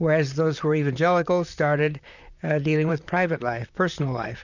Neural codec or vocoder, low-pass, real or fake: vocoder, 44.1 kHz, 128 mel bands, Pupu-Vocoder; 7.2 kHz; fake